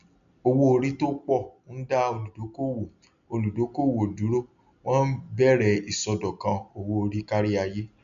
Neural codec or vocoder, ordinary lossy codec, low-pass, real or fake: none; none; 7.2 kHz; real